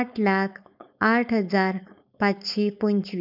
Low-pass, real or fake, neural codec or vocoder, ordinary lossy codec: 5.4 kHz; fake; codec, 16 kHz, 4.8 kbps, FACodec; none